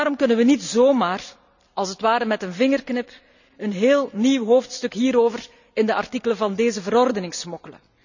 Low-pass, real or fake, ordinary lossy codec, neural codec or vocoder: 7.2 kHz; real; none; none